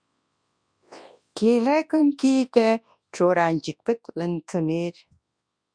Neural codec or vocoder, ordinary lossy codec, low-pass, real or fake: codec, 24 kHz, 0.9 kbps, WavTokenizer, large speech release; Opus, 64 kbps; 9.9 kHz; fake